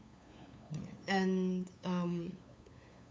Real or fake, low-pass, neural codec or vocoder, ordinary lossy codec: fake; none; codec, 16 kHz, 8 kbps, FunCodec, trained on LibriTTS, 25 frames a second; none